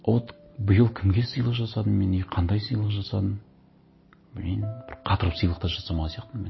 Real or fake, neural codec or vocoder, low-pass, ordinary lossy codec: real; none; 7.2 kHz; MP3, 24 kbps